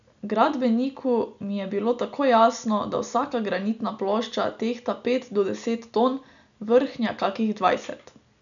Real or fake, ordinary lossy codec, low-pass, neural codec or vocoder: real; none; 7.2 kHz; none